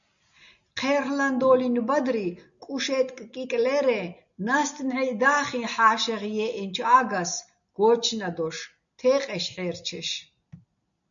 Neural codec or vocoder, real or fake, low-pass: none; real; 7.2 kHz